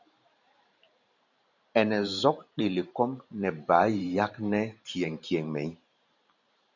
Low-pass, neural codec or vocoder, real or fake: 7.2 kHz; vocoder, 44.1 kHz, 128 mel bands every 512 samples, BigVGAN v2; fake